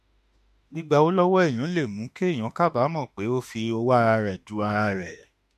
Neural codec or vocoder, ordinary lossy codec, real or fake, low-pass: autoencoder, 48 kHz, 32 numbers a frame, DAC-VAE, trained on Japanese speech; MP3, 64 kbps; fake; 14.4 kHz